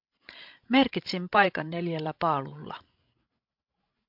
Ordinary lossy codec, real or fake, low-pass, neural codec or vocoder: MP3, 48 kbps; fake; 5.4 kHz; codec, 16 kHz, 16 kbps, FreqCodec, larger model